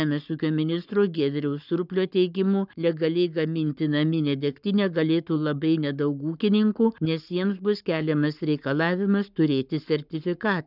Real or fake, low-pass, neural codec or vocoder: fake; 5.4 kHz; codec, 44.1 kHz, 7.8 kbps, Pupu-Codec